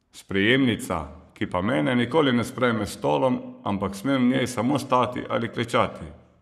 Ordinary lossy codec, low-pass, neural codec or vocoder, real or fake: none; 14.4 kHz; codec, 44.1 kHz, 7.8 kbps, Pupu-Codec; fake